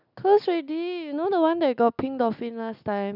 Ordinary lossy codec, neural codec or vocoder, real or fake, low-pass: none; none; real; 5.4 kHz